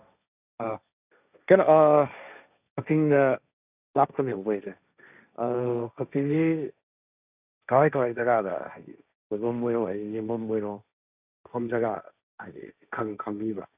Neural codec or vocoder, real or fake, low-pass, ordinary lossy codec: codec, 16 kHz, 1.1 kbps, Voila-Tokenizer; fake; 3.6 kHz; none